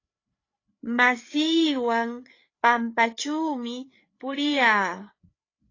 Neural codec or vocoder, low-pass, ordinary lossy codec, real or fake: codec, 16 kHz, 4 kbps, FreqCodec, larger model; 7.2 kHz; AAC, 32 kbps; fake